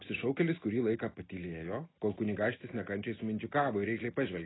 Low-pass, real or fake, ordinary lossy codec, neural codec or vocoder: 7.2 kHz; real; AAC, 16 kbps; none